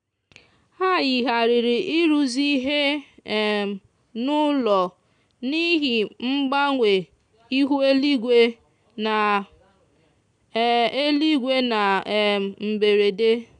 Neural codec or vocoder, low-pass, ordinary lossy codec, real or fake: none; 9.9 kHz; none; real